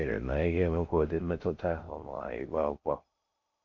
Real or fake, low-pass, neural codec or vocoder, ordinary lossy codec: fake; 7.2 kHz; codec, 16 kHz in and 24 kHz out, 0.6 kbps, FocalCodec, streaming, 4096 codes; MP3, 48 kbps